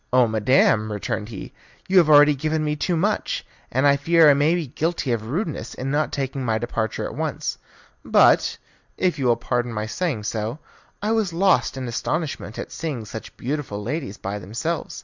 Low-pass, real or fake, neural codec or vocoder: 7.2 kHz; real; none